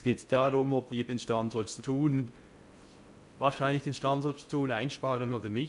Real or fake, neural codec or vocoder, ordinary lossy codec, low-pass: fake; codec, 16 kHz in and 24 kHz out, 0.6 kbps, FocalCodec, streaming, 4096 codes; MP3, 64 kbps; 10.8 kHz